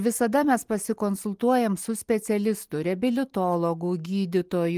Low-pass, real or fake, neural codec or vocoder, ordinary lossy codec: 14.4 kHz; real; none; Opus, 24 kbps